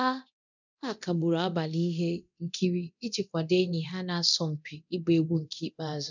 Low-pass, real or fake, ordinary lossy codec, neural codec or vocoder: 7.2 kHz; fake; none; codec, 24 kHz, 0.9 kbps, DualCodec